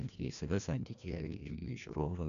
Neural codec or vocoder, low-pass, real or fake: codec, 16 kHz, 1 kbps, FreqCodec, larger model; 7.2 kHz; fake